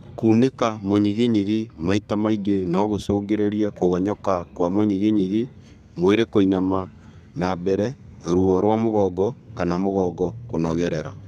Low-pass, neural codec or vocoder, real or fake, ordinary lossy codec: 14.4 kHz; codec, 32 kHz, 1.9 kbps, SNAC; fake; none